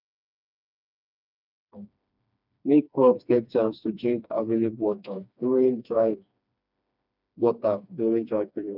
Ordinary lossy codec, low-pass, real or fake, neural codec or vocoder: none; 5.4 kHz; fake; codec, 16 kHz, 2 kbps, FreqCodec, smaller model